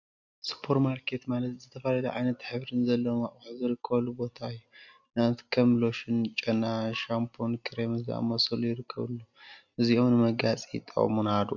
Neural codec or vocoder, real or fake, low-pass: none; real; 7.2 kHz